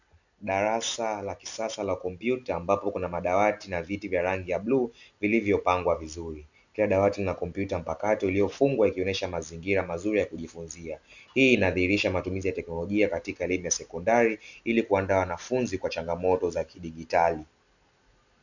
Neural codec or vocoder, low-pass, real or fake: none; 7.2 kHz; real